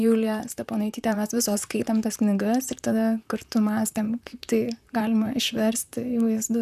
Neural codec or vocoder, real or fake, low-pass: vocoder, 44.1 kHz, 128 mel bands, Pupu-Vocoder; fake; 14.4 kHz